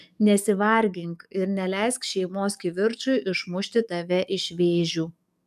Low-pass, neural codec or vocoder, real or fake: 14.4 kHz; codec, 44.1 kHz, 7.8 kbps, DAC; fake